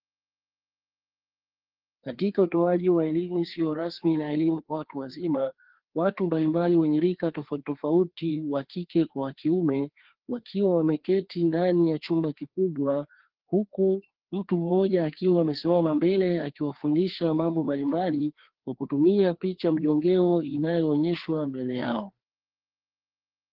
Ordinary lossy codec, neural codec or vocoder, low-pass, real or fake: Opus, 16 kbps; codec, 16 kHz, 2 kbps, FreqCodec, larger model; 5.4 kHz; fake